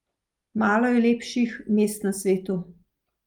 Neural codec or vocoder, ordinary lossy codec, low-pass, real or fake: none; Opus, 32 kbps; 19.8 kHz; real